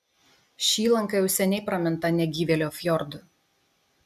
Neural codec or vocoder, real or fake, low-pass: none; real; 14.4 kHz